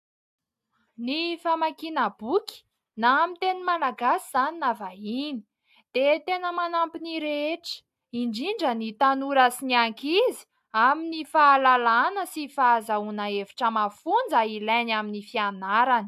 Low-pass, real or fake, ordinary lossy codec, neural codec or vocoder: 14.4 kHz; real; AAC, 96 kbps; none